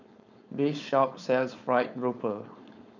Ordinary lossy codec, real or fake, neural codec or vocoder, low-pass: none; fake; codec, 16 kHz, 4.8 kbps, FACodec; 7.2 kHz